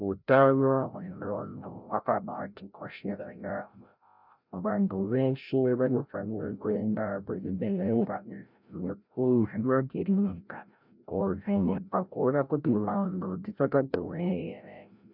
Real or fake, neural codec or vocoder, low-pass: fake; codec, 16 kHz, 0.5 kbps, FreqCodec, larger model; 5.4 kHz